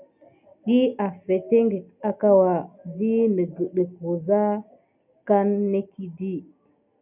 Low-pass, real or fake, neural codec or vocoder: 3.6 kHz; real; none